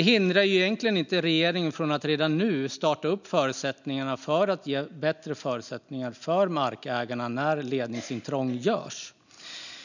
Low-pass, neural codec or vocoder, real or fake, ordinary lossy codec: 7.2 kHz; none; real; none